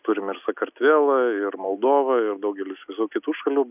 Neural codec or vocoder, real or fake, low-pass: none; real; 3.6 kHz